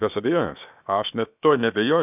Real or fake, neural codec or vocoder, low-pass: fake; codec, 16 kHz, 0.7 kbps, FocalCodec; 3.6 kHz